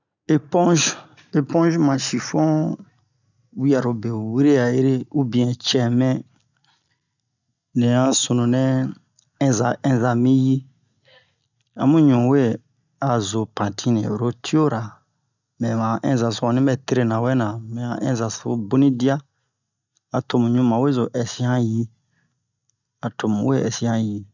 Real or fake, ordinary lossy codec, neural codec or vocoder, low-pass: real; none; none; 7.2 kHz